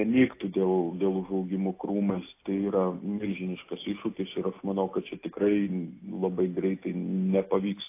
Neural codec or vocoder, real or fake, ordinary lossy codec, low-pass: none; real; AAC, 24 kbps; 3.6 kHz